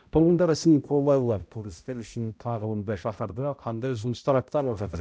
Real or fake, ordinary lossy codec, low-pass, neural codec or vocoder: fake; none; none; codec, 16 kHz, 0.5 kbps, X-Codec, HuBERT features, trained on balanced general audio